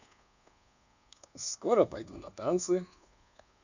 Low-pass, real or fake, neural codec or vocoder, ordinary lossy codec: 7.2 kHz; fake; codec, 24 kHz, 1.2 kbps, DualCodec; none